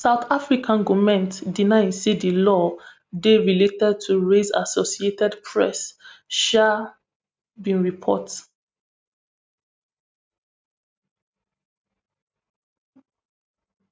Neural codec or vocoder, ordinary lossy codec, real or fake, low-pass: none; none; real; none